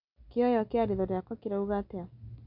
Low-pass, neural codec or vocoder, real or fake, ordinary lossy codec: 5.4 kHz; none; real; none